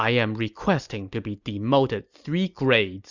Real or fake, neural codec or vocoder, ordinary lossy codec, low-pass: real; none; Opus, 64 kbps; 7.2 kHz